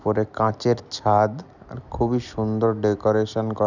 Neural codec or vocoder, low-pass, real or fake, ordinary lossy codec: none; 7.2 kHz; real; none